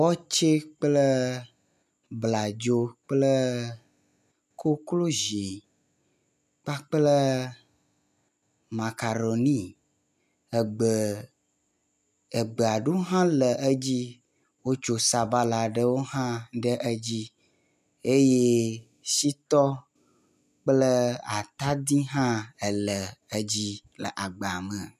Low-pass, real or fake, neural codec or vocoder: 14.4 kHz; real; none